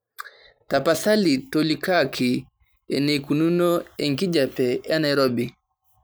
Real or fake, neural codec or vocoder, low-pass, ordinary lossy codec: fake; vocoder, 44.1 kHz, 128 mel bands every 512 samples, BigVGAN v2; none; none